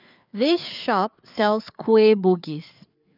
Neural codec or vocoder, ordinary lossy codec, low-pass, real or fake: codec, 16 kHz, 4 kbps, FreqCodec, larger model; none; 5.4 kHz; fake